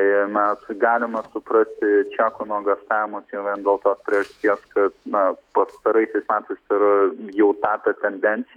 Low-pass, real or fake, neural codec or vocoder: 19.8 kHz; fake; autoencoder, 48 kHz, 128 numbers a frame, DAC-VAE, trained on Japanese speech